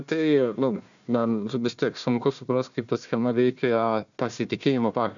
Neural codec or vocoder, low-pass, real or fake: codec, 16 kHz, 1 kbps, FunCodec, trained on Chinese and English, 50 frames a second; 7.2 kHz; fake